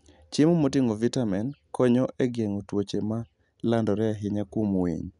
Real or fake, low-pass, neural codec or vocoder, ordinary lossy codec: real; 10.8 kHz; none; none